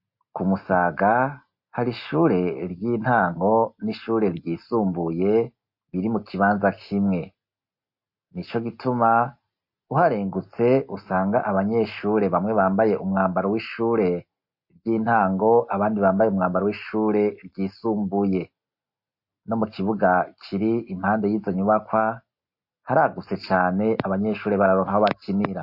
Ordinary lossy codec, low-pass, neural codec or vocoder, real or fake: MP3, 32 kbps; 5.4 kHz; none; real